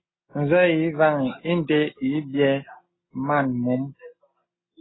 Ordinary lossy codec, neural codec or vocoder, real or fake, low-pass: AAC, 16 kbps; none; real; 7.2 kHz